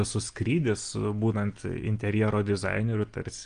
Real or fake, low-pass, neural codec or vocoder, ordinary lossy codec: real; 9.9 kHz; none; Opus, 32 kbps